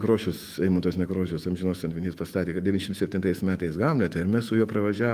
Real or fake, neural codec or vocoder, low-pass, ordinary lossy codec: fake; autoencoder, 48 kHz, 128 numbers a frame, DAC-VAE, trained on Japanese speech; 14.4 kHz; Opus, 32 kbps